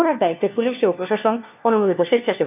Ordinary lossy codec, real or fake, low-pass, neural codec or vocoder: none; fake; 3.6 kHz; codec, 16 kHz, 1 kbps, FunCodec, trained on LibriTTS, 50 frames a second